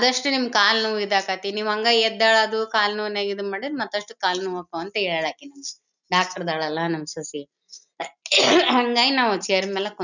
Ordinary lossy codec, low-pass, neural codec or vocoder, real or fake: none; 7.2 kHz; none; real